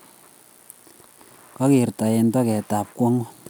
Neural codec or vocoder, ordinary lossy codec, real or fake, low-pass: none; none; real; none